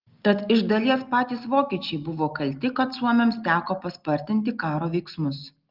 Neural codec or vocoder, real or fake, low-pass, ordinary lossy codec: none; real; 5.4 kHz; Opus, 32 kbps